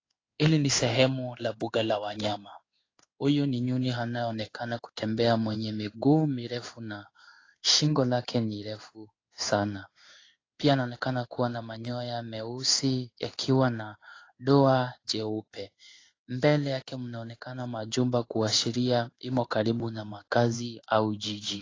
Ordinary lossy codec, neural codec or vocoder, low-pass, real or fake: AAC, 32 kbps; codec, 16 kHz in and 24 kHz out, 1 kbps, XY-Tokenizer; 7.2 kHz; fake